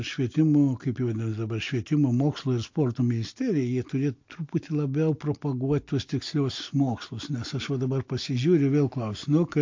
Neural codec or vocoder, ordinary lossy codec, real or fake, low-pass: none; MP3, 48 kbps; real; 7.2 kHz